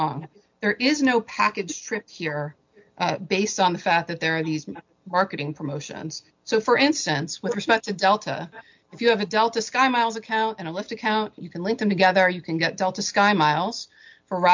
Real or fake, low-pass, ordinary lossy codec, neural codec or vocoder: real; 7.2 kHz; MP3, 64 kbps; none